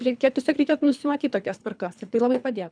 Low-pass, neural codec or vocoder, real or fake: 9.9 kHz; codec, 24 kHz, 3 kbps, HILCodec; fake